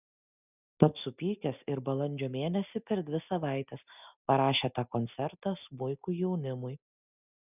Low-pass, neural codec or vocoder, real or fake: 3.6 kHz; none; real